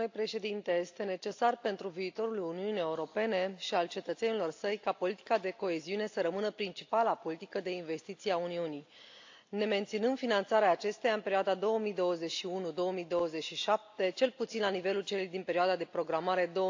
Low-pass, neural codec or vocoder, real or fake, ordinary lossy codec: 7.2 kHz; none; real; AAC, 48 kbps